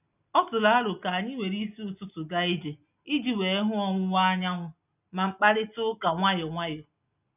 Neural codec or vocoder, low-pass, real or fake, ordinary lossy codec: none; 3.6 kHz; real; none